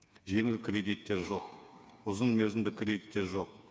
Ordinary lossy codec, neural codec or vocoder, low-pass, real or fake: none; codec, 16 kHz, 4 kbps, FreqCodec, smaller model; none; fake